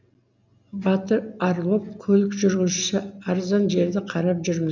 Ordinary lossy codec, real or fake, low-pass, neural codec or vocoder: none; real; 7.2 kHz; none